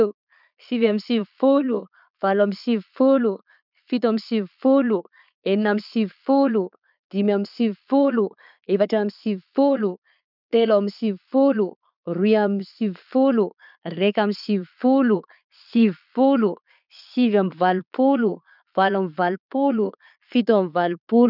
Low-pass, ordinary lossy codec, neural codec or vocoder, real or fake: 5.4 kHz; none; vocoder, 22.05 kHz, 80 mel bands, Vocos; fake